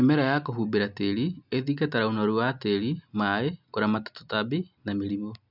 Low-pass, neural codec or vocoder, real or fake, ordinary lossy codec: 5.4 kHz; none; real; none